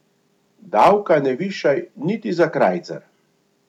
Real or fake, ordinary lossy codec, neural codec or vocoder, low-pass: real; none; none; 19.8 kHz